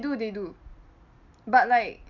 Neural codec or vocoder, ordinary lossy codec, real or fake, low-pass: none; none; real; 7.2 kHz